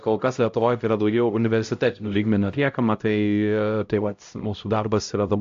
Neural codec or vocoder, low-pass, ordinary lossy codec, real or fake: codec, 16 kHz, 0.5 kbps, X-Codec, HuBERT features, trained on LibriSpeech; 7.2 kHz; AAC, 64 kbps; fake